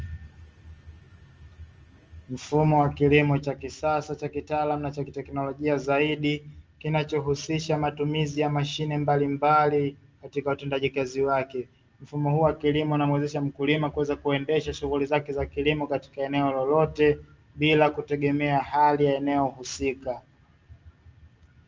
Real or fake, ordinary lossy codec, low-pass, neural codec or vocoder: real; Opus, 24 kbps; 7.2 kHz; none